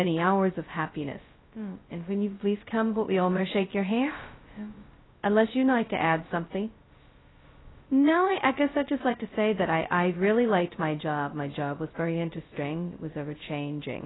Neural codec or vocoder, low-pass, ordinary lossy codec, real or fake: codec, 16 kHz, 0.2 kbps, FocalCodec; 7.2 kHz; AAC, 16 kbps; fake